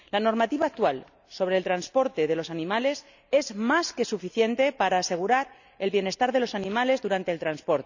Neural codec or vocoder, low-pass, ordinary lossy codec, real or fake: none; 7.2 kHz; none; real